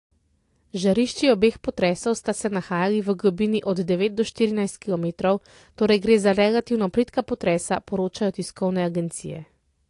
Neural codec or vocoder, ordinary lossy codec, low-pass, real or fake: none; AAC, 48 kbps; 10.8 kHz; real